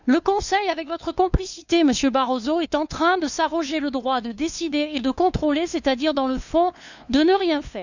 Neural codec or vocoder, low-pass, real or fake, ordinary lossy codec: codec, 16 kHz, 2 kbps, FunCodec, trained on LibriTTS, 25 frames a second; 7.2 kHz; fake; none